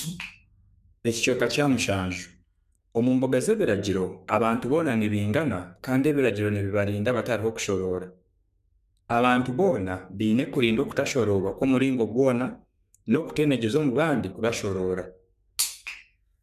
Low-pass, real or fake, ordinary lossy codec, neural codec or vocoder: 14.4 kHz; fake; none; codec, 32 kHz, 1.9 kbps, SNAC